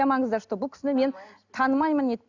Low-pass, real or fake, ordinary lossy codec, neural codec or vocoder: 7.2 kHz; real; none; none